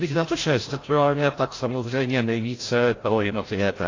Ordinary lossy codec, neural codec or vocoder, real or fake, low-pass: AAC, 32 kbps; codec, 16 kHz, 0.5 kbps, FreqCodec, larger model; fake; 7.2 kHz